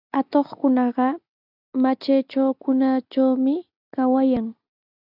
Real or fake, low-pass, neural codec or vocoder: real; 5.4 kHz; none